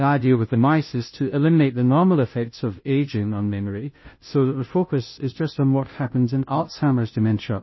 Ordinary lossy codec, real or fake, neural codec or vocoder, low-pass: MP3, 24 kbps; fake; codec, 16 kHz, 0.5 kbps, FunCodec, trained on Chinese and English, 25 frames a second; 7.2 kHz